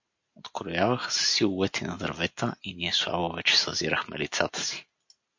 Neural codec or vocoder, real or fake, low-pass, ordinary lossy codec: none; real; 7.2 kHz; MP3, 48 kbps